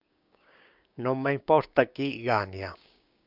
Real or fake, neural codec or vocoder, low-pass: fake; codec, 16 kHz, 6 kbps, DAC; 5.4 kHz